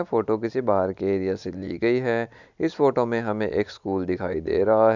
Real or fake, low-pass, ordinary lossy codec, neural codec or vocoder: real; 7.2 kHz; none; none